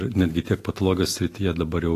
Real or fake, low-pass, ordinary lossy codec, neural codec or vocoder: real; 14.4 kHz; AAC, 48 kbps; none